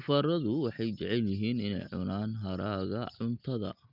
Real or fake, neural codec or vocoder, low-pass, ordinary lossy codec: real; none; 5.4 kHz; Opus, 32 kbps